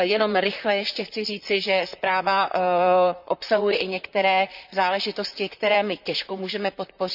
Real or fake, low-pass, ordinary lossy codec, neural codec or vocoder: fake; 5.4 kHz; none; vocoder, 44.1 kHz, 128 mel bands, Pupu-Vocoder